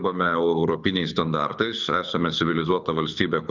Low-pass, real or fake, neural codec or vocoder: 7.2 kHz; fake; codec, 24 kHz, 6 kbps, HILCodec